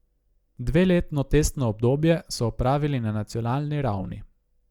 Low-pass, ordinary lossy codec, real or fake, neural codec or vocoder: 19.8 kHz; Opus, 64 kbps; real; none